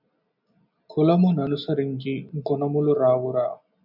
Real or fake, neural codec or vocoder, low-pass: real; none; 5.4 kHz